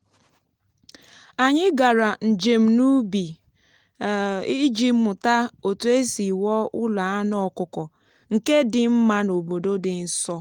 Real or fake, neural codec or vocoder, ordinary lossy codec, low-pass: real; none; Opus, 24 kbps; 19.8 kHz